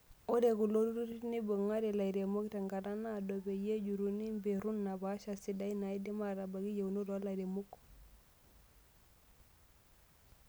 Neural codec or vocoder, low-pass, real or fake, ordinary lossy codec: none; none; real; none